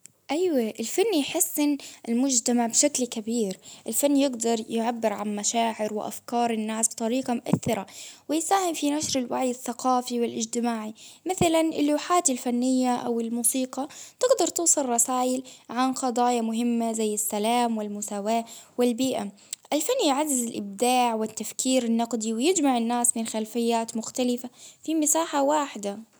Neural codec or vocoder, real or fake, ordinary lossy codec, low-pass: none; real; none; none